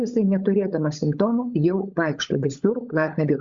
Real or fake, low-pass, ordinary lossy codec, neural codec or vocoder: fake; 7.2 kHz; Opus, 64 kbps; codec, 16 kHz, 16 kbps, FunCodec, trained on LibriTTS, 50 frames a second